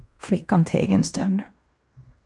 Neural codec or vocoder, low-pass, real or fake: codec, 16 kHz in and 24 kHz out, 0.9 kbps, LongCat-Audio-Codec, fine tuned four codebook decoder; 10.8 kHz; fake